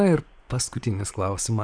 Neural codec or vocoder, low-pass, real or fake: vocoder, 24 kHz, 100 mel bands, Vocos; 9.9 kHz; fake